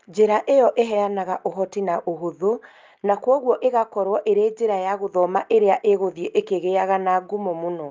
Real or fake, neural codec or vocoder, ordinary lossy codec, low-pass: real; none; Opus, 32 kbps; 7.2 kHz